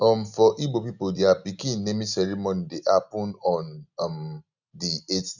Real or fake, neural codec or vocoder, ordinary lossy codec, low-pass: real; none; none; 7.2 kHz